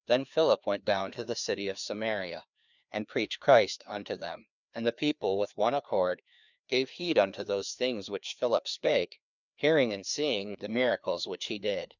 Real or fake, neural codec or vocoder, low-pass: fake; codec, 16 kHz, 2 kbps, FreqCodec, larger model; 7.2 kHz